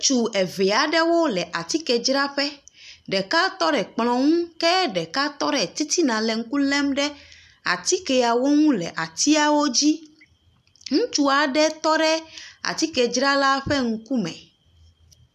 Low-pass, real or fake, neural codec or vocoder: 14.4 kHz; real; none